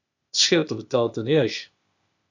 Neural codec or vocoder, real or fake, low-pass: codec, 16 kHz, 0.8 kbps, ZipCodec; fake; 7.2 kHz